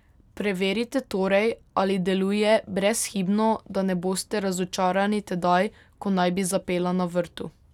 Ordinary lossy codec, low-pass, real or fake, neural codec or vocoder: none; 19.8 kHz; real; none